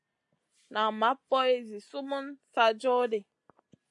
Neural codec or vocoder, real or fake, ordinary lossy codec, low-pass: none; real; AAC, 64 kbps; 10.8 kHz